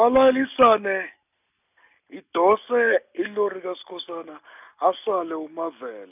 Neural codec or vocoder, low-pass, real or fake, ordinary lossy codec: none; 3.6 kHz; real; none